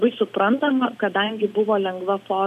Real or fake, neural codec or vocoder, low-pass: real; none; 14.4 kHz